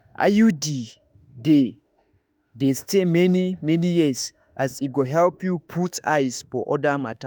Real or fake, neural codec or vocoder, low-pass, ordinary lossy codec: fake; autoencoder, 48 kHz, 32 numbers a frame, DAC-VAE, trained on Japanese speech; none; none